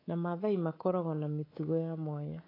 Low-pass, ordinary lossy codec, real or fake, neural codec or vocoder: 5.4 kHz; AAC, 24 kbps; fake; codec, 24 kHz, 3.1 kbps, DualCodec